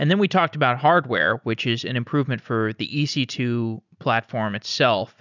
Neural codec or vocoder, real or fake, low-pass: none; real; 7.2 kHz